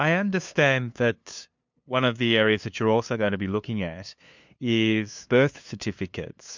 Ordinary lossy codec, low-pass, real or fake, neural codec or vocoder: MP3, 64 kbps; 7.2 kHz; fake; codec, 16 kHz, 2 kbps, FunCodec, trained on LibriTTS, 25 frames a second